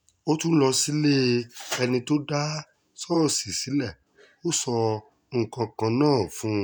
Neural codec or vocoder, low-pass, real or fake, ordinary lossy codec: none; none; real; none